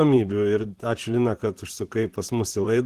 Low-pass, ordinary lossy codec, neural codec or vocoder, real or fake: 14.4 kHz; Opus, 16 kbps; vocoder, 44.1 kHz, 128 mel bands, Pupu-Vocoder; fake